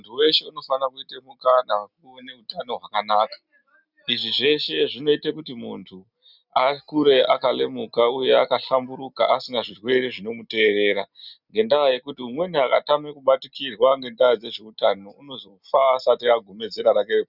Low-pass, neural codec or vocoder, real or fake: 5.4 kHz; none; real